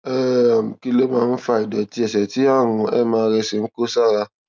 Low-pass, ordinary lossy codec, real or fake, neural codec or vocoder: none; none; real; none